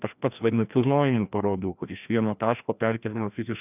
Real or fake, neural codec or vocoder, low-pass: fake; codec, 16 kHz, 1 kbps, FreqCodec, larger model; 3.6 kHz